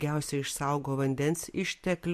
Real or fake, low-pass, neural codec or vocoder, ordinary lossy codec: real; 14.4 kHz; none; MP3, 64 kbps